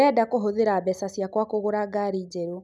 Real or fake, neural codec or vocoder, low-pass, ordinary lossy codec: real; none; none; none